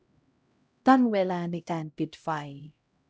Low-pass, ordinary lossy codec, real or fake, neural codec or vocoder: none; none; fake; codec, 16 kHz, 0.5 kbps, X-Codec, HuBERT features, trained on LibriSpeech